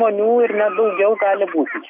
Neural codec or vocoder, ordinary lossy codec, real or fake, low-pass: none; MP3, 32 kbps; real; 3.6 kHz